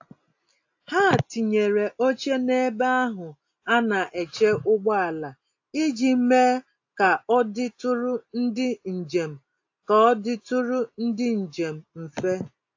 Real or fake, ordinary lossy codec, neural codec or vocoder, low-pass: real; AAC, 48 kbps; none; 7.2 kHz